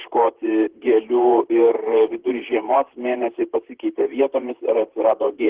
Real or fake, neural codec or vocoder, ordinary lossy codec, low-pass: fake; vocoder, 44.1 kHz, 128 mel bands, Pupu-Vocoder; Opus, 16 kbps; 3.6 kHz